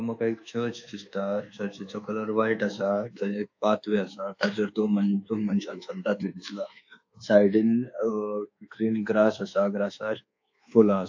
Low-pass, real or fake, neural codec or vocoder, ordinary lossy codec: 7.2 kHz; fake; codec, 24 kHz, 1.2 kbps, DualCodec; AAC, 48 kbps